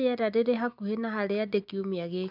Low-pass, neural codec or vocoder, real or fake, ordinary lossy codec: 5.4 kHz; none; real; none